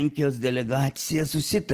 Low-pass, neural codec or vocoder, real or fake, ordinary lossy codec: 14.4 kHz; none; real; Opus, 16 kbps